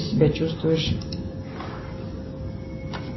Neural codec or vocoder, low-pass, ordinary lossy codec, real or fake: none; 7.2 kHz; MP3, 24 kbps; real